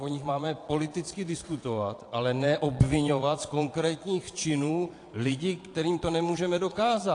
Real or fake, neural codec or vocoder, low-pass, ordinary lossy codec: fake; vocoder, 22.05 kHz, 80 mel bands, Vocos; 9.9 kHz; AAC, 48 kbps